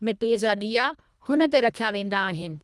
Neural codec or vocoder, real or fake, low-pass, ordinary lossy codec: codec, 24 kHz, 1.5 kbps, HILCodec; fake; none; none